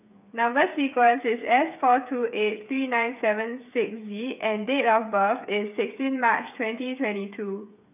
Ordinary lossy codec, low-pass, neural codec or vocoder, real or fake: none; 3.6 kHz; codec, 16 kHz, 8 kbps, FreqCodec, smaller model; fake